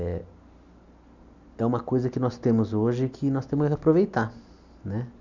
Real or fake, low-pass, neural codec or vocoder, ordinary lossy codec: real; 7.2 kHz; none; none